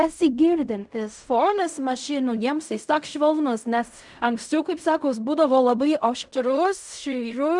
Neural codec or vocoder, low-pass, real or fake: codec, 16 kHz in and 24 kHz out, 0.4 kbps, LongCat-Audio-Codec, fine tuned four codebook decoder; 10.8 kHz; fake